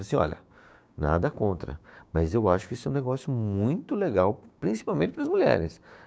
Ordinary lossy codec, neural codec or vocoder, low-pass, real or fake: none; codec, 16 kHz, 6 kbps, DAC; none; fake